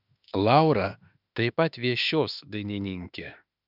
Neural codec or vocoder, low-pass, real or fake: autoencoder, 48 kHz, 32 numbers a frame, DAC-VAE, trained on Japanese speech; 5.4 kHz; fake